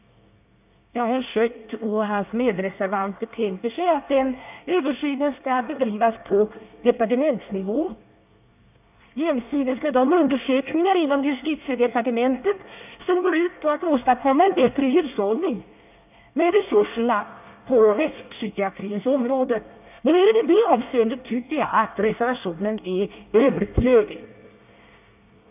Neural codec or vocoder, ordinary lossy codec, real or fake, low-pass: codec, 24 kHz, 1 kbps, SNAC; none; fake; 3.6 kHz